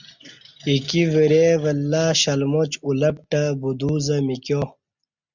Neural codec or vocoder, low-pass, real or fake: none; 7.2 kHz; real